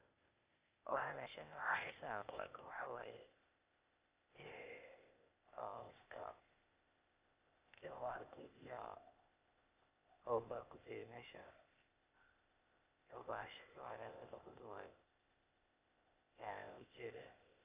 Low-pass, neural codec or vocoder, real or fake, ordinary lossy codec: 3.6 kHz; codec, 16 kHz, 0.8 kbps, ZipCodec; fake; Opus, 64 kbps